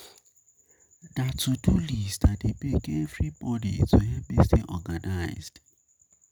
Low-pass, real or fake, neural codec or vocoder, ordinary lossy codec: none; real; none; none